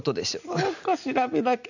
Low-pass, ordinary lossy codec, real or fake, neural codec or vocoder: 7.2 kHz; none; real; none